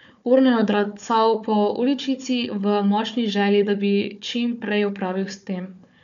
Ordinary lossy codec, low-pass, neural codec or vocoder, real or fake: none; 7.2 kHz; codec, 16 kHz, 4 kbps, FunCodec, trained on Chinese and English, 50 frames a second; fake